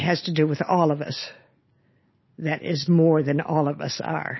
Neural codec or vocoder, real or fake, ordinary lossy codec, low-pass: none; real; MP3, 24 kbps; 7.2 kHz